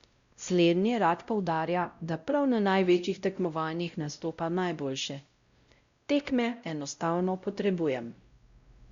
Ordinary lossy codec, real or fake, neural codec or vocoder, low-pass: Opus, 64 kbps; fake; codec, 16 kHz, 0.5 kbps, X-Codec, WavLM features, trained on Multilingual LibriSpeech; 7.2 kHz